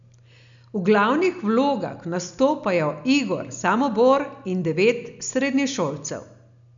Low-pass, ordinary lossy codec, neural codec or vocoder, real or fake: 7.2 kHz; none; none; real